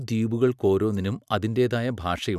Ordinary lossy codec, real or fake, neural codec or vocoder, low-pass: none; fake; vocoder, 44.1 kHz, 128 mel bands every 256 samples, BigVGAN v2; 14.4 kHz